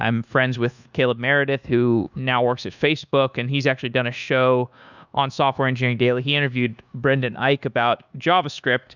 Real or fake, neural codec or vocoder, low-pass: fake; codec, 24 kHz, 1.2 kbps, DualCodec; 7.2 kHz